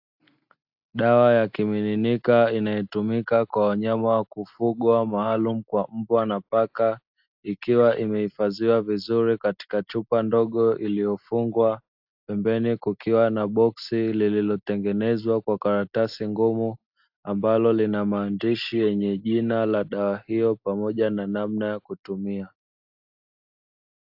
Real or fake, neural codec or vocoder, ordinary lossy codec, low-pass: real; none; AAC, 48 kbps; 5.4 kHz